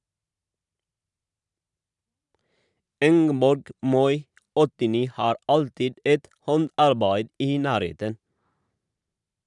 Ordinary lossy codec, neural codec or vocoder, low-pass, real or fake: none; vocoder, 44.1 kHz, 128 mel bands every 512 samples, BigVGAN v2; 10.8 kHz; fake